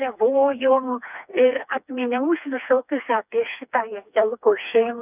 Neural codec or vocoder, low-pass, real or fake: codec, 16 kHz, 2 kbps, FreqCodec, smaller model; 3.6 kHz; fake